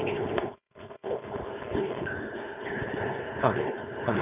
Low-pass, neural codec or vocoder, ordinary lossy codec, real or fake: 3.6 kHz; codec, 16 kHz, 4.8 kbps, FACodec; none; fake